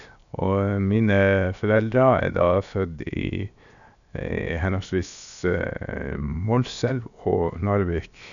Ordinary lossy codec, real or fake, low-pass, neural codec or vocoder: none; fake; 7.2 kHz; codec, 16 kHz, 0.7 kbps, FocalCodec